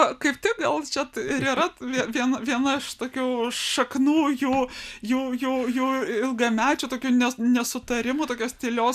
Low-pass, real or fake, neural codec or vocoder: 14.4 kHz; real; none